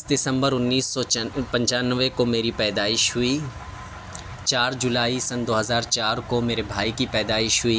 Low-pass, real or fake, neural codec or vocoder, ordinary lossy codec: none; real; none; none